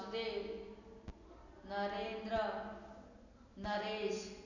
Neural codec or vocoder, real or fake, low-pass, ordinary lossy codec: none; real; 7.2 kHz; none